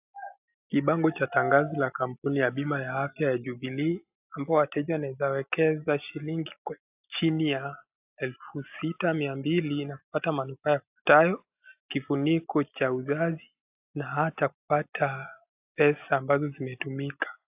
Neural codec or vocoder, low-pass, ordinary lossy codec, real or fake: none; 3.6 kHz; AAC, 32 kbps; real